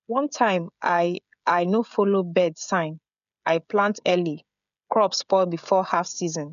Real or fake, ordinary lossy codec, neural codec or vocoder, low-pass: fake; none; codec, 16 kHz, 16 kbps, FreqCodec, smaller model; 7.2 kHz